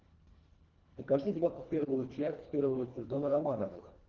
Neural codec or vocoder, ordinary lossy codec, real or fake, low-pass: codec, 24 kHz, 1.5 kbps, HILCodec; Opus, 16 kbps; fake; 7.2 kHz